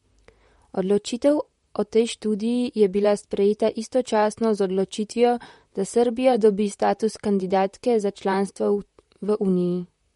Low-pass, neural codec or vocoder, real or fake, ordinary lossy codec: 19.8 kHz; vocoder, 44.1 kHz, 128 mel bands, Pupu-Vocoder; fake; MP3, 48 kbps